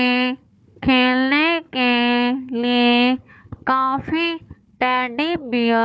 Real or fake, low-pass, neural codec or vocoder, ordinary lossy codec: fake; none; codec, 16 kHz, 4 kbps, FunCodec, trained on Chinese and English, 50 frames a second; none